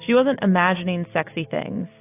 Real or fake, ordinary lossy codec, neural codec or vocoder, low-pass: real; AAC, 32 kbps; none; 3.6 kHz